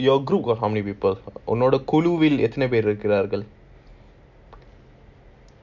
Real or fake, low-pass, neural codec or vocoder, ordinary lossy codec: real; 7.2 kHz; none; none